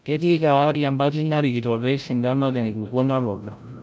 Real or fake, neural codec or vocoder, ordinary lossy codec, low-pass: fake; codec, 16 kHz, 0.5 kbps, FreqCodec, larger model; none; none